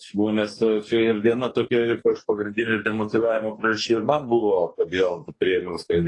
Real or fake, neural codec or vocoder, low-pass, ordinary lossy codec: fake; codec, 44.1 kHz, 2.6 kbps, DAC; 10.8 kHz; AAC, 32 kbps